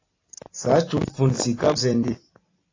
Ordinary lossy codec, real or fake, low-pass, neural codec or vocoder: AAC, 32 kbps; fake; 7.2 kHz; vocoder, 44.1 kHz, 128 mel bands every 256 samples, BigVGAN v2